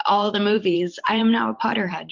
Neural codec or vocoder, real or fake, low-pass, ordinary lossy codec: vocoder, 44.1 kHz, 128 mel bands every 512 samples, BigVGAN v2; fake; 7.2 kHz; MP3, 48 kbps